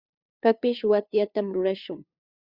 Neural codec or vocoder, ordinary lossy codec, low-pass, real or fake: codec, 16 kHz, 2 kbps, FunCodec, trained on LibriTTS, 25 frames a second; Opus, 64 kbps; 5.4 kHz; fake